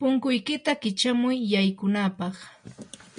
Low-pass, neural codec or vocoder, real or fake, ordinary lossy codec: 9.9 kHz; none; real; MP3, 64 kbps